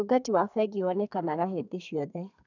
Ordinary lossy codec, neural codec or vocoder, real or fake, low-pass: none; codec, 32 kHz, 1.9 kbps, SNAC; fake; 7.2 kHz